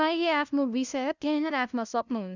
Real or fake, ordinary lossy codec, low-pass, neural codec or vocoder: fake; none; 7.2 kHz; codec, 16 kHz, 0.5 kbps, FunCodec, trained on LibriTTS, 25 frames a second